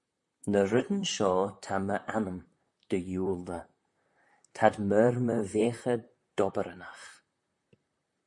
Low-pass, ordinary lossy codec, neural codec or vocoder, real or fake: 10.8 kHz; MP3, 48 kbps; vocoder, 44.1 kHz, 128 mel bands, Pupu-Vocoder; fake